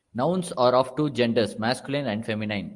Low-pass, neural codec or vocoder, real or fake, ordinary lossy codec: 10.8 kHz; none; real; Opus, 32 kbps